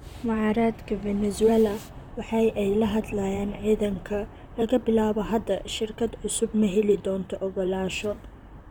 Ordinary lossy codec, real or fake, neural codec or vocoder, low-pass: none; fake; vocoder, 44.1 kHz, 128 mel bands, Pupu-Vocoder; 19.8 kHz